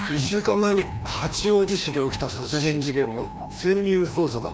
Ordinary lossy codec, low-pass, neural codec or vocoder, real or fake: none; none; codec, 16 kHz, 1 kbps, FreqCodec, larger model; fake